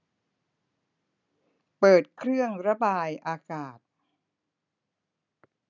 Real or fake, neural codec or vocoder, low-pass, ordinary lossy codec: real; none; 7.2 kHz; none